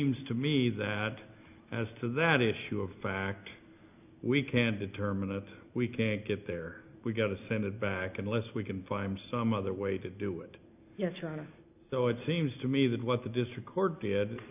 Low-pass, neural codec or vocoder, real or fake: 3.6 kHz; none; real